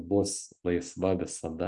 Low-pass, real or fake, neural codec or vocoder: 10.8 kHz; real; none